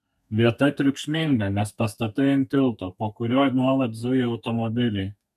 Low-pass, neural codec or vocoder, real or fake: 14.4 kHz; codec, 32 kHz, 1.9 kbps, SNAC; fake